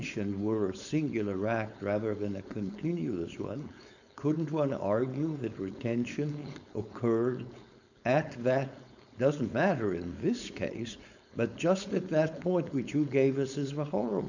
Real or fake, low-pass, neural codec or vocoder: fake; 7.2 kHz; codec, 16 kHz, 4.8 kbps, FACodec